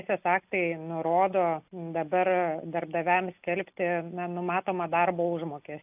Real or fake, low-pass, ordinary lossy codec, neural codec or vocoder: real; 3.6 kHz; AAC, 32 kbps; none